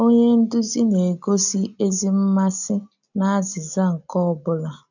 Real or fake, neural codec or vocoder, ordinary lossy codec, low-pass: real; none; none; 7.2 kHz